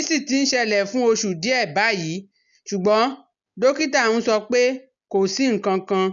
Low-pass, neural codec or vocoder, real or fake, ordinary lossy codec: 7.2 kHz; none; real; none